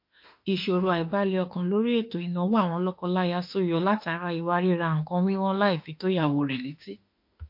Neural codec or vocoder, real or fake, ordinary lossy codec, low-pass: autoencoder, 48 kHz, 32 numbers a frame, DAC-VAE, trained on Japanese speech; fake; MP3, 32 kbps; 5.4 kHz